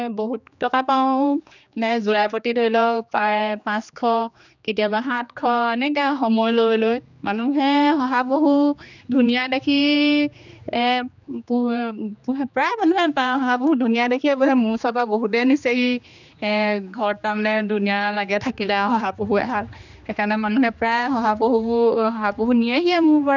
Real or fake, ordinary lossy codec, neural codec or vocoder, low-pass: fake; none; codec, 16 kHz, 2 kbps, X-Codec, HuBERT features, trained on general audio; 7.2 kHz